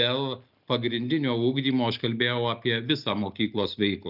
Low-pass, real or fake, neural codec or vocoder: 5.4 kHz; real; none